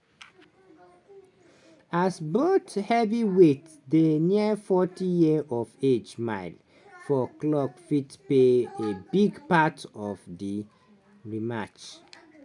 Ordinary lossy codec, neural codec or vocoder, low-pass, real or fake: none; none; 10.8 kHz; real